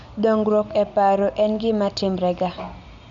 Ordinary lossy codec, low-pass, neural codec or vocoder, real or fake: none; 7.2 kHz; none; real